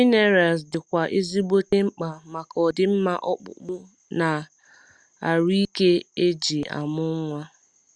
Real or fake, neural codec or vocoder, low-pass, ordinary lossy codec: real; none; 9.9 kHz; Opus, 64 kbps